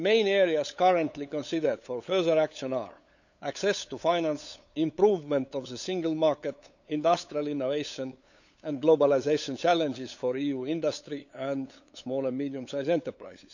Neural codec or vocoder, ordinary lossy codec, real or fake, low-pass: codec, 16 kHz, 8 kbps, FunCodec, trained on LibriTTS, 25 frames a second; none; fake; 7.2 kHz